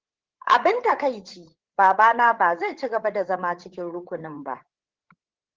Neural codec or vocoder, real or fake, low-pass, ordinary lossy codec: codec, 16 kHz, 8 kbps, FreqCodec, larger model; fake; 7.2 kHz; Opus, 16 kbps